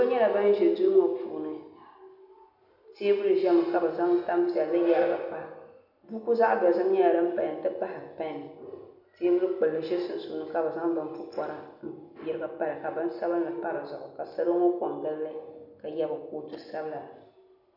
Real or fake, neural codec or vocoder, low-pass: real; none; 5.4 kHz